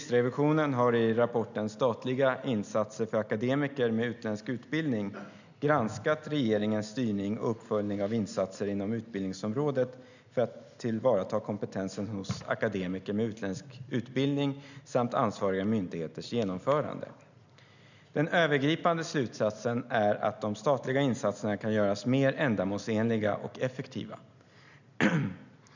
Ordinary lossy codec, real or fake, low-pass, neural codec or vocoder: none; real; 7.2 kHz; none